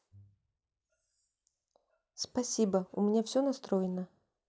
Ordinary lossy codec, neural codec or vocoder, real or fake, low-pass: none; none; real; none